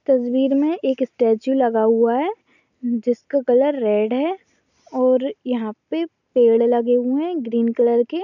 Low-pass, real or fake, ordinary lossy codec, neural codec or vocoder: 7.2 kHz; real; none; none